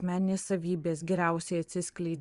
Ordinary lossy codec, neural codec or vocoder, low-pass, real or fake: Opus, 64 kbps; vocoder, 24 kHz, 100 mel bands, Vocos; 10.8 kHz; fake